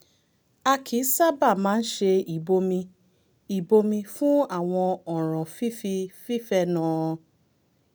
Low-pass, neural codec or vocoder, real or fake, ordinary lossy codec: none; none; real; none